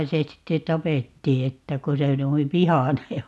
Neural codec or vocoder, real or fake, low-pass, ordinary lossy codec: none; real; none; none